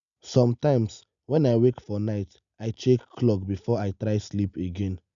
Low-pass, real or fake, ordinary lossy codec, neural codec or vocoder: 7.2 kHz; real; none; none